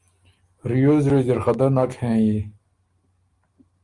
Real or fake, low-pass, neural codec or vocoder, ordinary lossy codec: real; 10.8 kHz; none; Opus, 32 kbps